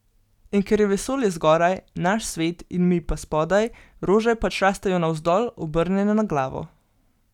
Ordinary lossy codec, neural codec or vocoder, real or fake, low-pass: none; none; real; 19.8 kHz